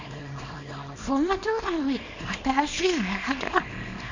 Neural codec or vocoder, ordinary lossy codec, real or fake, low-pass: codec, 24 kHz, 0.9 kbps, WavTokenizer, small release; Opus, 64 kbps; fake; 7.2 kHz